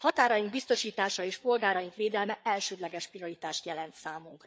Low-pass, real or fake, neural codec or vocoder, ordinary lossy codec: none; fake; codec, 16 kHz, 4 kbps, FreqCodec, larger model; none